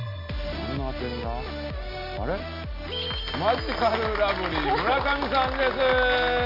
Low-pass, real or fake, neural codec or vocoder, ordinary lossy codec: 5.4 kHz; real; none; none